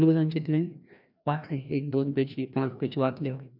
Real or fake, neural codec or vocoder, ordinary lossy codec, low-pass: fake; codec, 16 kHz, 1 kbps, FreqCodec, larger model; none; 5.4 kHz